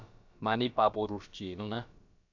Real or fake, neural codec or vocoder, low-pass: fake; codec, 16 kHz, about 1 kbps, DyCAST, with the encoder's durations; 7.2 kHz